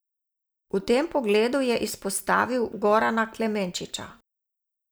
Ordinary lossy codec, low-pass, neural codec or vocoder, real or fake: none; none; none; real